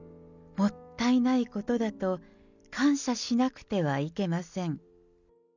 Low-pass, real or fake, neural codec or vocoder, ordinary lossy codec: 7.2 kHz; real; none; none